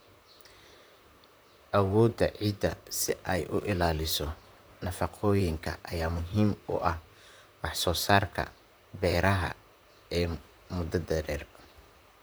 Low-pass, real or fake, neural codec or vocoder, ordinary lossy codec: none; fake; vocoder, 44.1 kHz, 128 mel bands, Pupu-Vocoder; none